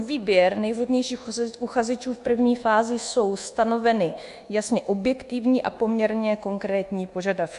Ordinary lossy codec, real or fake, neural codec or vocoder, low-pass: AAC, 64 kbps; fake; codec, 24 kHz, 1.2 kbps, DualCodec; 10.8 kHz